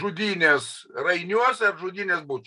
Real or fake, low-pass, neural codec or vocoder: real; 10.8 kHz; none